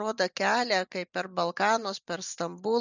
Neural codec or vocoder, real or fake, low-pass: none; real; 7.2 kHz